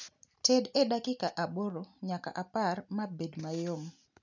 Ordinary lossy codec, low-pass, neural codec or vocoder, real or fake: none; 7.2 kHz; none; real